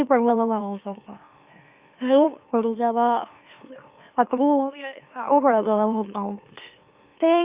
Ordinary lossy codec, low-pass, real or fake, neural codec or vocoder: Opus, 64 kbps; 3.6 kHz; fake; autoencoder, 44.1 kHz, a latent of 192 numbers a frame, MeloTTS